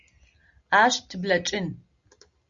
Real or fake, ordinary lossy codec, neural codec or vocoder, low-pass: real; Opus, 64 kbps; none; 7.2 kHz